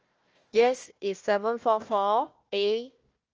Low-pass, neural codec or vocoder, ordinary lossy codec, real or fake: 7.2 kHz; codec, 16 kHz, 1 kbps, FunCodec, trained on Chinese and English, 50 frames a second; Opus, 24 kbps; fake